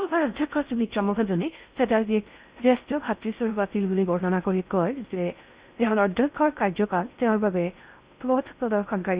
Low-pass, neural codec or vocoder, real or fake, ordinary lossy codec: 3.6 kHz; codec, 16 kHz in and 24 kHz out, 0.6 kbps, FocalCodec, streaming, 4096 codes; fake; Opus, 64 kbps